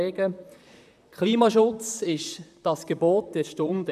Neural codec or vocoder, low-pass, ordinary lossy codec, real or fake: vocoder, 44.1 kHz, 128 mel bands, Pupu-Vocoder; 14.4 kHz; none; fake